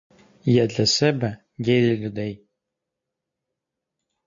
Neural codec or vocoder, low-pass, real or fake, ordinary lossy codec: none; 7.2 kHz; real; MP3, 48 kbps